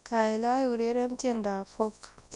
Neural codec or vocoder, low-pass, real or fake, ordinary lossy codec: codec, 24 kHz, 0.9 kbps, WavTokenizer, large speech release; 10.8 kHz; fake; none